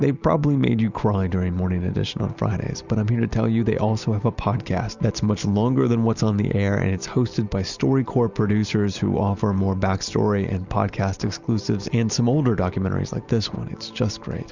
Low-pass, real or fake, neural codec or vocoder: 7.2 kHz; real; none